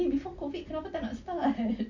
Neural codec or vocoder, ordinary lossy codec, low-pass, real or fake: none; none; 7.2 kHz; real